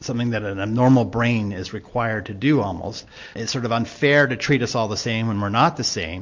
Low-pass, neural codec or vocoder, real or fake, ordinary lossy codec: 7.2 kHz; none; real; MP3, 48 kbps